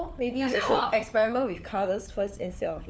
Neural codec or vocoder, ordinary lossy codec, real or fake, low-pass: codec, 16 kHz, 4 kbps, FunCodec, trained on LibriTTS, 50 frames a second; none; fake; none